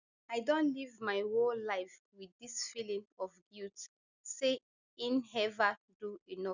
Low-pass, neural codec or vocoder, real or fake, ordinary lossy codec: 7.2 kHz; none; real; none